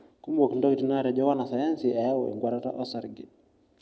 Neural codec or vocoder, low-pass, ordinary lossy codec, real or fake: none; none; none; real